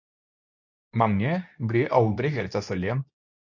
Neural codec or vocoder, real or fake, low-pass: codec, 24 kHz, 0.9 kbps, WavTokenizer, medium speech release version 2; fake; 7.2 kHz